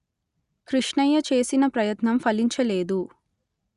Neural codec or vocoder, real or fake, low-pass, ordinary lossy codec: none; real; 10.8 kHz; Opus, 64 kbps